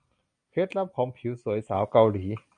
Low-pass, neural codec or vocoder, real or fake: 9.9 kHz; none; real